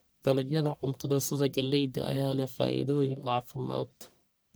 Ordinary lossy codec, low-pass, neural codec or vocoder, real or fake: none; none; codec, 44.1 kHz, 1.7 kbps, Pupu-Codec; fake